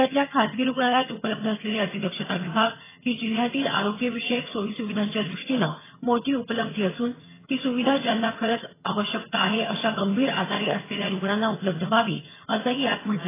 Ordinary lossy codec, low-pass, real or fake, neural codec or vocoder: AAC, 16 kbps; 3.6 kHz; fake; vocoder, 22.05 kHz, 80 mel bands, HiFi-GAN